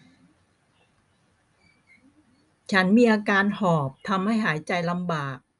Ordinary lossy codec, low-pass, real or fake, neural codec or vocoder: none; 10.8 kHz; real; none